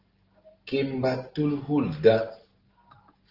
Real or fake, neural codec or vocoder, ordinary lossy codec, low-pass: real; none; Opus, 16 kbps; 5.4 kHz